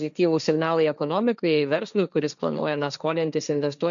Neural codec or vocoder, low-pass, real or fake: codec, 16 kHz, 1.1 kbps, Voila-Tokenizer; 7.2 kHz; fake